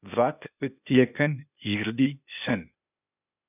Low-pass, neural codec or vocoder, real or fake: 3.6 kHz; codec, 16 kHz, 0.8 kbps, ZipCodec; fake